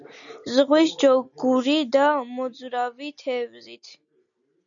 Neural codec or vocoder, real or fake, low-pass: none; real; 7.2 kHz